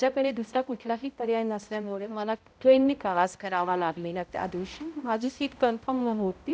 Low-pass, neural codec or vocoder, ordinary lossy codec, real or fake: none; codec, 16 kHz, 0.5 kbps, X-Codec, HuBERT features, trained on balanced general audio; none; fake